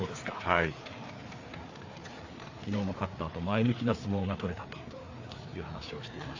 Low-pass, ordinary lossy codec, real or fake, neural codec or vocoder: 7.2 kHz; AAC, 48 kbps; fake; codec, 16 kHz, 4 kbps, FreqCodec, larger model